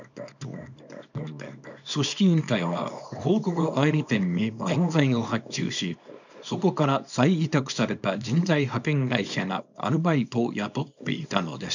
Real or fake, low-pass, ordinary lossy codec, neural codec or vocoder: fake; 7.2 kHz; none; codec, 24 kHz, 0.9 kbps, WavTokenizer, small release